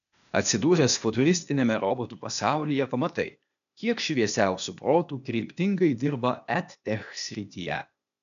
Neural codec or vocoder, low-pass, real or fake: codec, 16 kHz, 0.8 kbps, ZipCodec; 7.2 kHz; fake